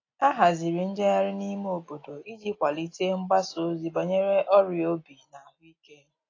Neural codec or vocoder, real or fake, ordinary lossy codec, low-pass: none; real; AAC, 32 kbps; 7.2 kHz